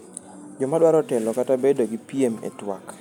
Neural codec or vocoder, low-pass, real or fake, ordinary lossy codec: none; 19.8 kHz; real; none